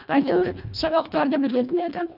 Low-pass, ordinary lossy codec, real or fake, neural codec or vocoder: 5.4 kHz; none; fake; codec, 24 kHz, 1.5 kbps, HILCodec